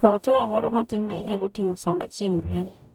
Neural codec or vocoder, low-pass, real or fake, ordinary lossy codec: codec, 44.1 kHz, 0.9 kbps, DAC; 19.8 kHz; fake; none